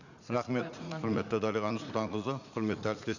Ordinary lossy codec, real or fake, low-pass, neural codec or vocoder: none; fake; 7.2 kHz; vocoder, 44.1 kHz, 80 mel bands, Vocos